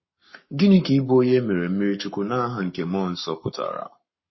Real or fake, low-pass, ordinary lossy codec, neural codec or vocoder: fake; 7.2 kHz; MP3, 24 kbps; codec, 44.1 kHz, 7.8 kbps, Pupu-Codec